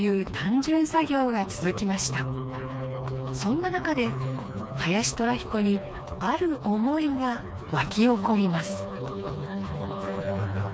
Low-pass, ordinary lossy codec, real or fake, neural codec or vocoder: none; none; fake; codec, 16 kHz, 2 kbps, FreqCodec, smaller model